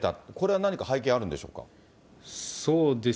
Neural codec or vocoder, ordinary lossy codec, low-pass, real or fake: none; none; none; real